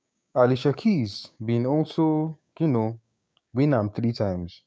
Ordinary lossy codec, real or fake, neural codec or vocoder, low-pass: none; fake; codec, 16 kHz, 6 kbps, DAC; none